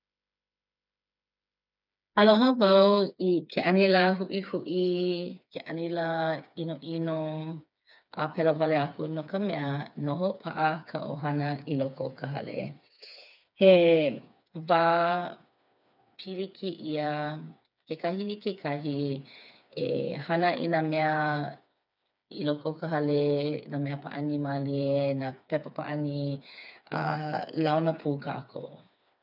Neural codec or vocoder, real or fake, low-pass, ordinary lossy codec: codec, 16 kHz, 4 kbps, FreqCodec, smaller model; fake; 5.4 kHz; none